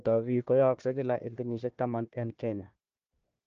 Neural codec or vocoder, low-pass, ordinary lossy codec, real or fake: codec, 16 kHz, 1 kbps, FunCodec, trained on Chinese and English, 50 frames a second; 7.2 kHz; Opus, 24 kbps; fake